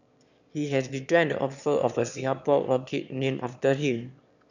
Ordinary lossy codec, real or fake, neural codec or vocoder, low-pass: none; fake; autoencoder, 22.05 kHz, a latent of 192 numbers a frame, VITS, trained on one speaker; 7.2 kHz